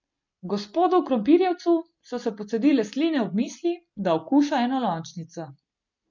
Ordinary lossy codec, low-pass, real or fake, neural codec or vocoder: MP3, 64 kbps; 7.2 kHz; real; none